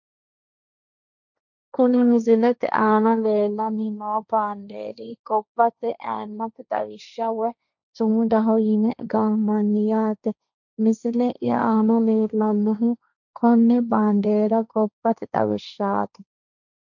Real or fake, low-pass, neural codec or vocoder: fake; 7.2 kHz; codec, 16 kHz, 1.1 kbps, Voila-Tokenizer